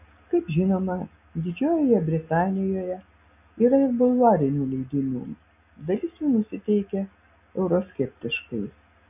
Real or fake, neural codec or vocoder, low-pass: real; none; 3.6 kHz